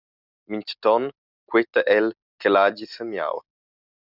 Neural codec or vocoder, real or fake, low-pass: none; real; 5.4 kHz